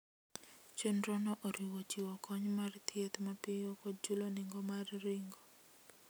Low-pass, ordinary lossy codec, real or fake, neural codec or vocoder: none; none; real; none